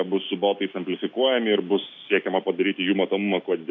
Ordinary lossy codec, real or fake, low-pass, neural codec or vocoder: MP3, 64 kbps; real; 7.2 kHz; none